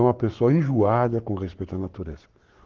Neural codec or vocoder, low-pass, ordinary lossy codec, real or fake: codec, 44.1 kHz, 7.8 kbps, Pupu-Codec; 7.2 kHz; Opus, 16 kbps; fake